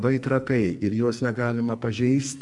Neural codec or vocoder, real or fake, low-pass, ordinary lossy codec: codec, 44.1 kHz, 2.6 kbps, SNAC; fake; 10.8 kHz; MP3, 96 kbps